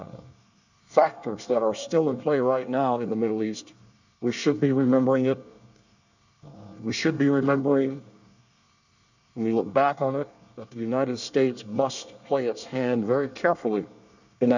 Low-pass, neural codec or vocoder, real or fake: 7.2 kHz; codec, 24 kHz, 1 kbps, SNAC; fake